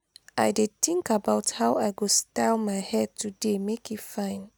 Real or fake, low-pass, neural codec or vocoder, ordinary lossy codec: real; none; none; none